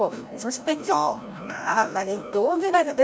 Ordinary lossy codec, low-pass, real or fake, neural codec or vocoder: none; none; fake; codec, 16 kHz, 0.5 kbps, FreqCodec, larger model